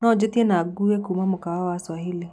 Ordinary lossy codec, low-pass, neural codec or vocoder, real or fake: none; none; none; real